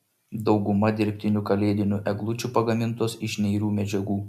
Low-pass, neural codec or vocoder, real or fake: 14.4 kHz; none; real